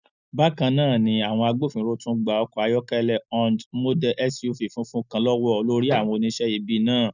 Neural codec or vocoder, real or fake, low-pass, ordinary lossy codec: none; real; 7.2 kHz; none